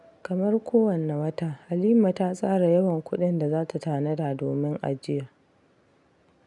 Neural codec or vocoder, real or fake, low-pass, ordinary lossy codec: none; real; 10.8 kHz; none